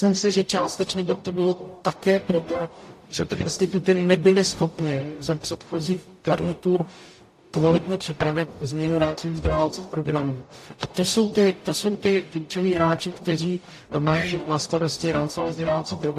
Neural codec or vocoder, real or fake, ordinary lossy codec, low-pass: codec, 44.1 kHz, 0.9 kbps, DAC; fake; AAC, 64 kbps; 14.4 kHz